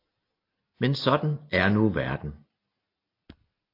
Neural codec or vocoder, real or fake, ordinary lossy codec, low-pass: none; real; AAC, 32 kbps; 5.4 kHz